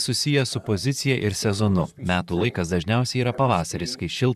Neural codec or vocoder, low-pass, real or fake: vocoder, 44.1 kHz, 128 mel bands, Pupu-Vocoder; 14.4 kHz; fake